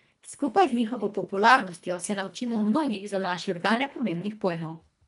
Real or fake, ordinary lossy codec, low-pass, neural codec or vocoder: fake; none; 10.8 kHz; codec, 24 kHz, 1.5 kbps, HILCodec